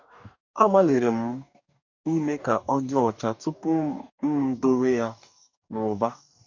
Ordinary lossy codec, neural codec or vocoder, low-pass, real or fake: none; codec, 44.1 kHz, 2.6 kbps, DAC; 7.2 kHz; fake